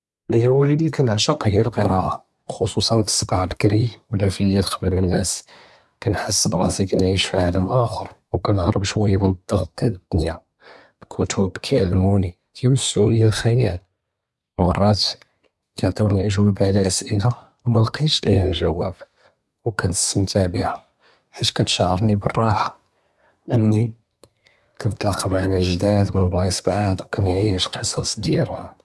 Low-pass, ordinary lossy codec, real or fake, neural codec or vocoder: none; none; fake; codec, 24 kHz, 1 kbps, SNAC